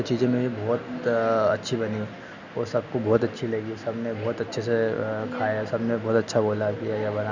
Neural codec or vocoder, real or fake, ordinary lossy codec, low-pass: none; real; none; 7.2 kHz